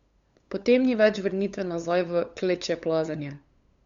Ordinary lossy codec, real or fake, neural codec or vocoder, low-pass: Opus, 64 kbps; fake; codec, 16 kHz, 8 kbps, FunCodec, trained on LibriTTS, 25 frames a second; 7.2 kHz